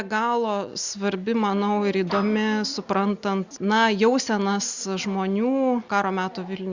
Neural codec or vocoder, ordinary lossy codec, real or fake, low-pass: none; Opus, 64 kbps; real; 7.2 kHz